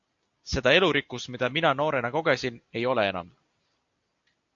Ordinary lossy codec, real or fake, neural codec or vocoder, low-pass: AAC, 64 kbps; real; none; 7.2 kHz